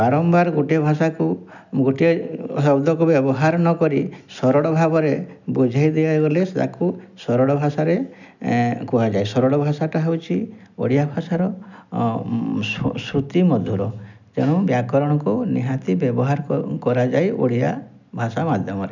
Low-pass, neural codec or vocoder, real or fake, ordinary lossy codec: 7.2 kHz; none; real; none